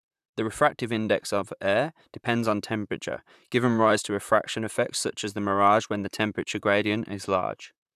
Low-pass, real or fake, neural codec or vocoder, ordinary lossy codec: 14.4 kHz; fake; vocoder, 44.1 kHz, 128 mel bands, Pupu-Vocoder; none